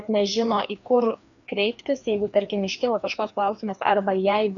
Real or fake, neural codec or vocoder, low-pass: fake; codec, 16 kHz, 2 kbps, FreqCodec, larger model; 7.2 kHz